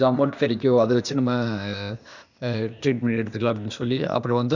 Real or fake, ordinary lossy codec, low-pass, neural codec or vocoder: fake; none; 7.2 kHz; codec, 16 kHz, 0.8 kbps, ZipCodec